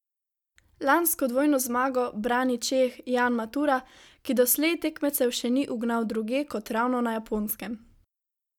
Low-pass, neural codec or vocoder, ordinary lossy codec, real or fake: 19.8 kHz; none; none; real